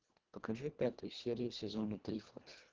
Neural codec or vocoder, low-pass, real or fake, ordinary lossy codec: codec, 24 kHz, 1.5 kbps, HILCodec; 7.2 kHz; fake; Opus, 16 kbps